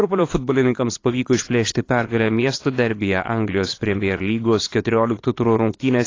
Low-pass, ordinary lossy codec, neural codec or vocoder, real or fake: 7.2 kHz; AAC, 32 kbps; vocoder, 22.05 kHz, 80 mel bands, Vocos; fake